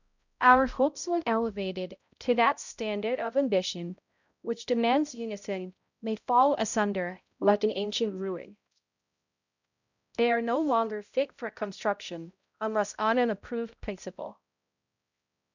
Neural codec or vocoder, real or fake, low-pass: codec, 16 kHz, 0.5 kbps, X-Codec, HuBERT features, trained on balanced general audio; fake; 7.2 kHz